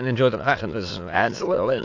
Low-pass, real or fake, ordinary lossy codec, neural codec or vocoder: 7.2 kHz; fake; AAC, 48 kbps; autoencoder, 22.05 kHz, a latent of 192 numbers a frame, VITS, trained on many speakers